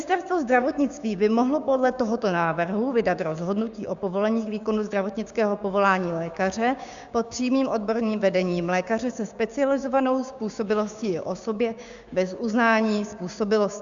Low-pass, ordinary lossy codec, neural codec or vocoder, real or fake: 7.2 kHz; Opus, 64 kbps; codec, 16 kHz, 6 kbps, DAC; fake